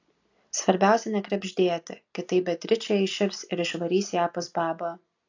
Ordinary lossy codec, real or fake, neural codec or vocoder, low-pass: AAC, 48 kbps; real; none; 7.2 kHz